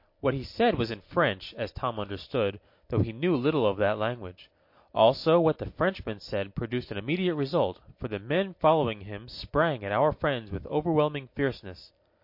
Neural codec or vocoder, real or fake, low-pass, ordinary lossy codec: none; real; 5.4 kHz; MP3, 32 kbps